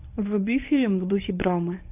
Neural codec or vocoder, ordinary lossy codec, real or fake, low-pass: codec, 24 kHz, 0.9 kbps, WavTokenizer, medium speech release version 1; AAC, 32 kbps; fake; 3.6 kHz